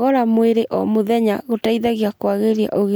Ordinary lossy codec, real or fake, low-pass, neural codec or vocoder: none; real; none; none